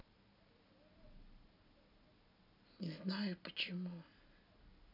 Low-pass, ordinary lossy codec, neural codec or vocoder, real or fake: 5.4 kHz; none; none; real